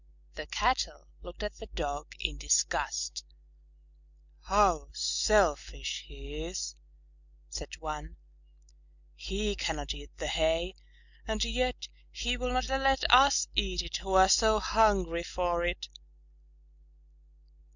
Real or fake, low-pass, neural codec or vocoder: real; 7.2 kHz; none